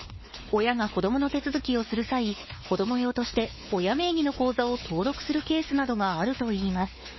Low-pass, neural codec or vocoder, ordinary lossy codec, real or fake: 7.2 kHz; codec, 16 kHz, 4 kbps, X-Codec, WavLM features, trained on Multilingual LibriSpeech; MP3, 24 kbps; fake